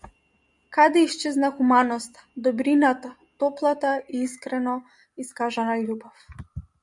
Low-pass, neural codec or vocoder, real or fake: 10.8 kHz; none; real